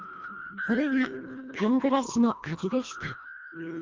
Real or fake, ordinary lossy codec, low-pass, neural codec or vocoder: fake; Opus, 24 kbps; 7.2 kHz; codec, 24 kHz, 1.5 kbps, HILCodec